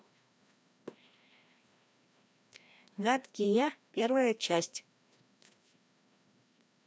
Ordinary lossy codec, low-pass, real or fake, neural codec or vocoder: none; none; fake; codec, 16 kHz, 1 kbps, FreqCodec, larger model